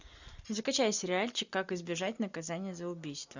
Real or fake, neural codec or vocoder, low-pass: real; none; 7.2 kHz